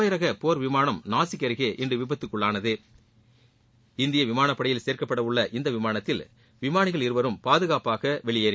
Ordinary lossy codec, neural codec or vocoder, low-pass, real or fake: none; none; none; real